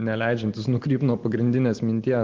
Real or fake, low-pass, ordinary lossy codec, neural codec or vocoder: real; 7.2 kHz; Opus, 16 kbps; none